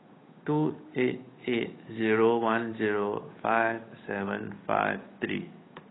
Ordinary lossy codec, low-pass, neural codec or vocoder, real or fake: AAC, 16 kbps; 7.2 kHz; codec, 16 kHz, 8 kbps, FunCodec, trained on Chinese and English, 25 frames a second; fake